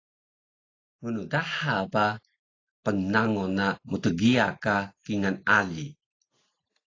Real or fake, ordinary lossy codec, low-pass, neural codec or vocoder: real; AAC, 32 kbps; 7.2 kHz; none